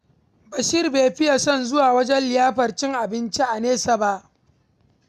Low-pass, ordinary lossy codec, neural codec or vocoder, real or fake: 14.4 kHz; none; none; real